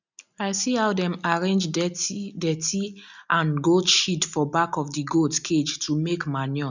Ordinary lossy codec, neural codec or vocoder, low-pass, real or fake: none; none; 7.2 kHz; real